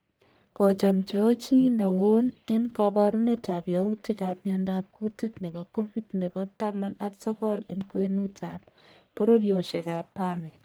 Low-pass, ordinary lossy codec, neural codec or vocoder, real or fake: none; none; codec, 44.1 kHz, 1.7 kbps, Pupu-Codec; fake